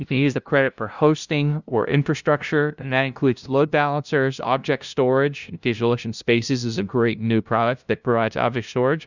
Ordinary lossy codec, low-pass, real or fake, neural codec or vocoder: Opus, 64 kbps; 7.2 kHz; fake; codec, 16 kHz, 0.5 kbps, FunCodec, trained on LibriTTS, 25 frames a second